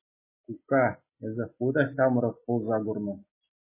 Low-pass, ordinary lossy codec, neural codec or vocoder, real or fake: 3.6 kHz; MP3, 16 kbps; none; real